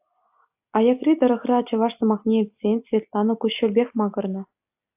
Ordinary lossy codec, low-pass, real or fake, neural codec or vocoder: AAC, 32 kbps; 3.6 kHz; real; none